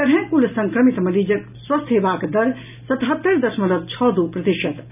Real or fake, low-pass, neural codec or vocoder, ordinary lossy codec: real; 3.6 kHz; none; none